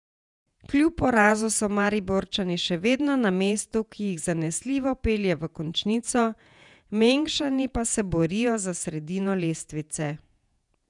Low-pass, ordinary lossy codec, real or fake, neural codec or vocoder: 10.8 kHz; none; fake; vocoder, 48 kHz, 128 mel bands, Vocos